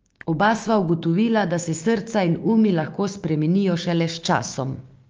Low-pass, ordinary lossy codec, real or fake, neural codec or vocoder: 7.2 kHz; Opus, 24 kbps; fake; codec, 16 kHz, 6 kbps, DAC